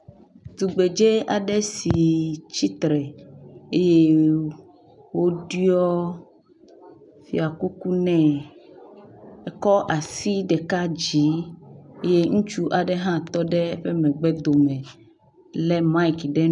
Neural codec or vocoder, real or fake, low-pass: none; real; 10.8 kHz